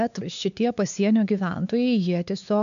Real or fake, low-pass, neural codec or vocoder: fake; 7.2 kHz; codec, 16 kHz, 4 kbps, X-Codec, WavLM features, trained on Multilingual LibriSpeech